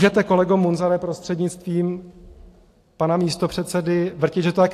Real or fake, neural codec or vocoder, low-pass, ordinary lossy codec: real; none; 14.4 kHz; AAC, 64 kbps